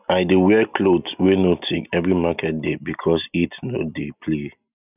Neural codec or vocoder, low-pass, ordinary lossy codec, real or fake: none; 3.6 kHz; none; real